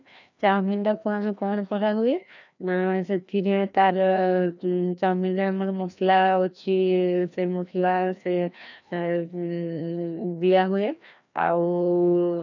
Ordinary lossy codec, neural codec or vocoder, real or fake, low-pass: none; codec, 16 kHz, 1 kbps, FreqCodec, larger model; fake; 7.2 kHz